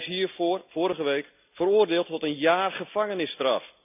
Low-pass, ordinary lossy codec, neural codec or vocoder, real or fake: 3.6 kHz; none; none; real